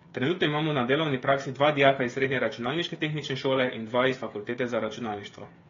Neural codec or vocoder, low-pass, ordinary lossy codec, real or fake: codec, 16 kHz, 8 kbps, FreqCodec, smaller model; 7.2 kHz; AAC, 32 kbps; fake